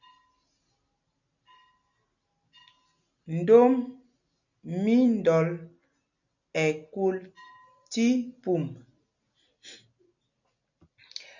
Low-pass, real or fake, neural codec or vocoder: 7.2 kHz; real; none